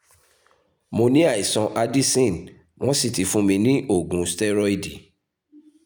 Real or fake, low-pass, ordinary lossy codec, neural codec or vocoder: real; none; none; none